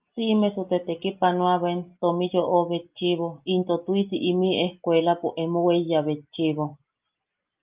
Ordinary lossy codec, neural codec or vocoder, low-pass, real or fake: Opus, 24 kbps; none; 3.6 kHz; real